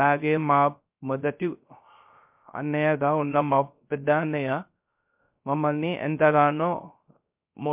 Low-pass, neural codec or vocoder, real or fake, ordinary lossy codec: 3.6 kHz; codec, 16 kHz, 0.3 kbps, FocalCodec; fake; MP3, 32 kbps